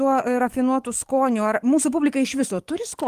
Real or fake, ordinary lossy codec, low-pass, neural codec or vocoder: fake; Opus, 24 kbps; 14.4 kHz; codec, 44.1 kHz, 7.8 kbps, DAC